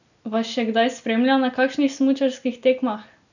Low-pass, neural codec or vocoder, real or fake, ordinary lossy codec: 7.2 kHz; none; real; none